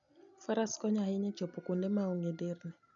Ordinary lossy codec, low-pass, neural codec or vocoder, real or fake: none; 7.2 kHz; none; real